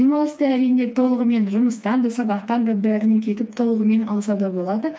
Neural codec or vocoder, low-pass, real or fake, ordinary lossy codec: codec, 16 kHz, 2 kbps, FreqCodec, smaller model; none; fake; none